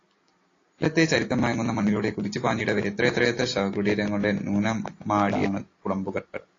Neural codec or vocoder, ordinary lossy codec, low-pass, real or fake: none; AAC, 32 kbps; 7.2 kHz; real